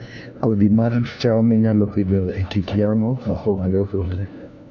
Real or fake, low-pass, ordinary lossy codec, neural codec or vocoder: fake; 7.2 kHz; none; codec, 16 kHz, 1 kbps, FunCodec, trained on LibriTTS, 50 frames a second